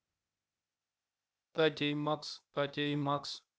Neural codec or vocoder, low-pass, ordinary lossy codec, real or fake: codec, 16 kHz, 0.8 kbps, ZipCodec; none; none; fake